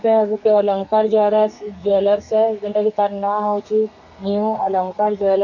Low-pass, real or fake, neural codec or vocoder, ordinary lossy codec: 7.2 kHz; fake; codec, 32 kHz, 1.9 kbps, SNAC; none